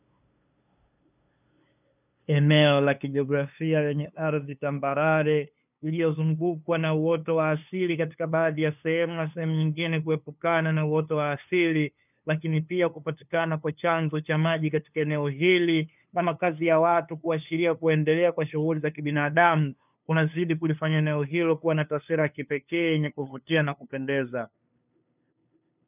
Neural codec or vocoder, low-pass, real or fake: codec, 16 kHz, 2 kbps, FunCodec, trained on LibriTTS, 25 frames a second; 3.6 kHz; fake